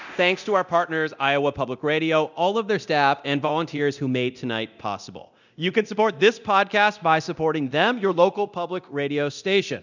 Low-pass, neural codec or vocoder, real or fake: 7.2 kHz; codec, 24 kHz, 0.9 kbps, DualCodec; fake